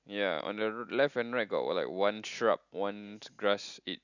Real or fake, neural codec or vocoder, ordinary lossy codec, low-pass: real; none; none; 7.2 kHz